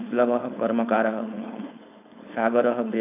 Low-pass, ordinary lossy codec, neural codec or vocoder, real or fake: 3.6 kHz; none; codec, 16 kHz, 4.8 kbps, FACodec; fake